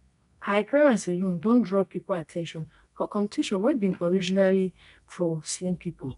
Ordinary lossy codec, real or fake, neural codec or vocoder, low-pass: none; fake; codec, 24 kHz, 0.9 kbps, WavTokenizer, medium music audio release; 10.8 kHz